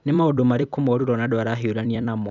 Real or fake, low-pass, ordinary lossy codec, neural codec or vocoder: fake; 7.2 kHz; none; vocoder, 44.1 kHz, 128 mel bands every 256 samples, BigVGAN v2